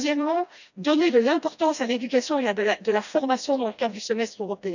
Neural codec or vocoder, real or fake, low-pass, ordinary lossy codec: codec, 16 kHz, 1 kbps, FreqCodec, smaller model; fake; 7.2 kHz; none